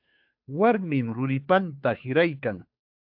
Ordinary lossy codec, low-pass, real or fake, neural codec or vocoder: AAC, 48 kbps; 5.4 kHz; fake; codec, 16 kHz, 2 kbps, FunCodec, trained on Chinese and English, 25 frames a second